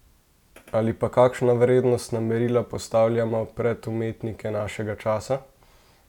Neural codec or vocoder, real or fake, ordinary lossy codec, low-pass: none; real; none; 19.8 kHz